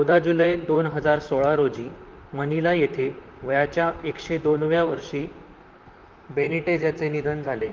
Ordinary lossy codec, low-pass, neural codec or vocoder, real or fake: Opus, 24 kbps; 7.2 kHz; vocoder, 44.1 kHz, 128 mel bands, Pupu-Vocoder; fake